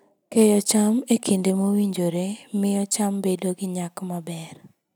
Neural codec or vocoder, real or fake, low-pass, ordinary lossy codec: none; real; none; none